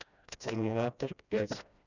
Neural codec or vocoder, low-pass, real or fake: codec, 16 kHz, 1 kbps, FreqCodec, smaller model; 7.2 kHz; fake